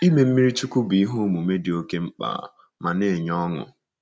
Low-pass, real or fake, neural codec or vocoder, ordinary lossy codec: none; real; none; none